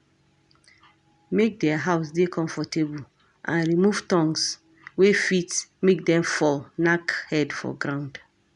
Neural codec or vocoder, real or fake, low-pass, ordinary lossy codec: none; real; 10.8 kHz; none